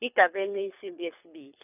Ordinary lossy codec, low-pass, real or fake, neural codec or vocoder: none; 3.6 kHz; fake; codec, 24 kHz, 6 kbps, HILCodec